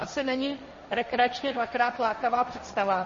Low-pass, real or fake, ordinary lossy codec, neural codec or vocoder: 7.2 kHz; fake; MP3, 32 kbps; codec, 16 kHz, 1.1 kbps, Voila-Tokenizer